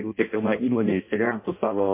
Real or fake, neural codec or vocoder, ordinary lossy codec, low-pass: fake; codec, 16 kHz in and 24 kHz out, 0.6 kbps, FireRedTTS-2 codec; MP3, 24 kbps; 3.6 kHz